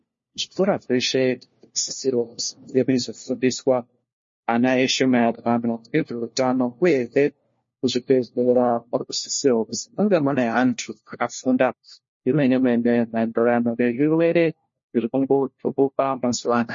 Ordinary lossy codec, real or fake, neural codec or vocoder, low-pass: MP3, 32 kbps; fake; codec, 16 kHz, 1 kbps, FunCodec, trained on LibriTTS, 50 frames a second; 7.2 kHz